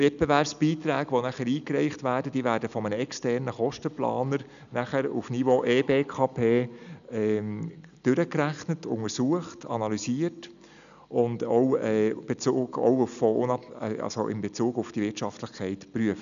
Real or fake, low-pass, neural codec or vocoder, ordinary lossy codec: real; 7.2 kHz; none; none